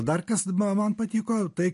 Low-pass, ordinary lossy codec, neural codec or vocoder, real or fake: 14.4 kHz; MP3, 48 kbps; none; real